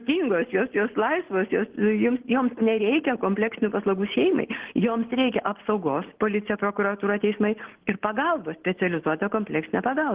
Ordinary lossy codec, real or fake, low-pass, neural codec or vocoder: Opus, 16 kbps; real; 3.6 kHz; none